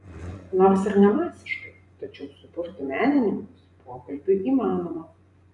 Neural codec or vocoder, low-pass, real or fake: vocoder, 24 kHz, 100 mel bands, Vocos; 10.8 kHz; fake